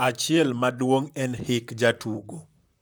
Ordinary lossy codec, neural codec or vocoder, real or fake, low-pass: none; vocoder, 44.1 kHz, 128 mel bands, Pupu-Vocoder; fake; none